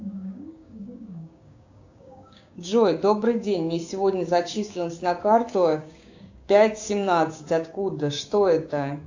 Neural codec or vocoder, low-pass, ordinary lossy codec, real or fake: codec, 16 kHz, 6 kbps, DAC; 7.2 kHz; AAC, 48 kbps; fake